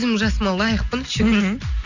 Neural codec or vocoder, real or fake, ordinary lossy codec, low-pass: none; real; none; 7.2 kHz